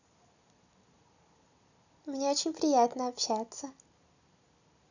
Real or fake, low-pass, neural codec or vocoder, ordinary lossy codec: real; 7.2 kHz; none; none